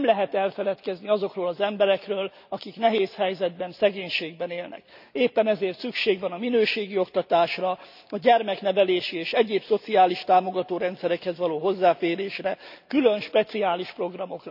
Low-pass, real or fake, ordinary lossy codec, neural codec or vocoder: 5.4 kHz; real; none; none